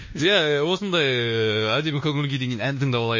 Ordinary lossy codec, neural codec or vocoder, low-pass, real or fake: MP3, 32 kbps; codec, 24 kHz, 1.2 kbps, DualCodec; 7.2 kHz; fake